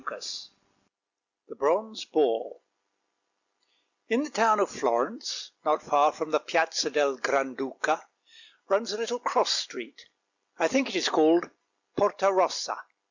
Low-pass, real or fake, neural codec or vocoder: 7.2 kHz; real; none